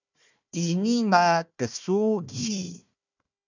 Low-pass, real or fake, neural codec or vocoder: 7.2 kHz; fake; codec, 16 kHz, 1 kbps, FunCodec, trained on Chinese and English, 50 frames a second